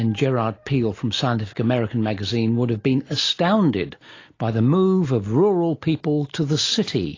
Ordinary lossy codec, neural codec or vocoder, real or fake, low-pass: AAC, 32 kbps; none; real; 7.2 kHz